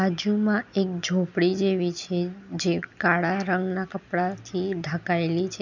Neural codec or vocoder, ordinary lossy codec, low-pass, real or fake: none; none; 7.2 kHz; real